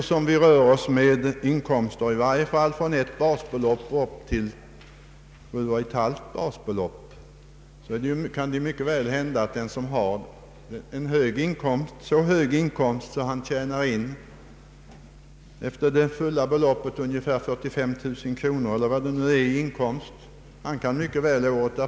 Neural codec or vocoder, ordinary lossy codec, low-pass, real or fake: none; none; none; real